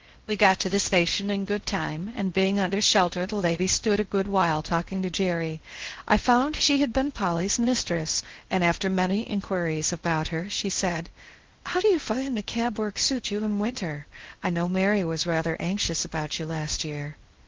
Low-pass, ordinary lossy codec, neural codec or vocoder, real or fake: 7.2 kHz; Opus, 16 kbps; codec, 16 kHz in and 24 kHz out, 0.6 kbps, FocalCodec, streaming, 4096 codes; fake